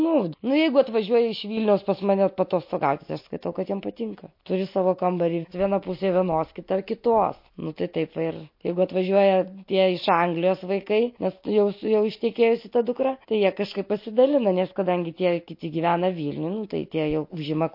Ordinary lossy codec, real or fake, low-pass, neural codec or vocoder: MP3, 32 kbps; real; 5.4 kHz; none